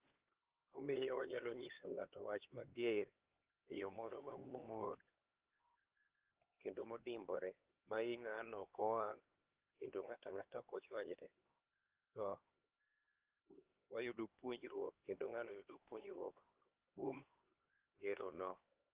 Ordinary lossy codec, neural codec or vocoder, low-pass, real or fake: Opus, 16 kbps; codec, 16 kHz, 2 kbps, X-Codec, HuBERT features, trained on LibriSpeech; 3.6 kHz; fake